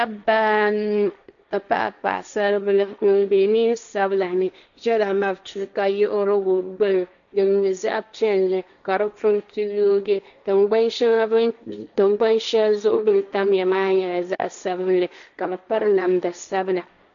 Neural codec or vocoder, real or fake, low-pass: codec, 16 kHz, 1.1 kbps, Voila-Tokenizer; fake; 7.2 kHz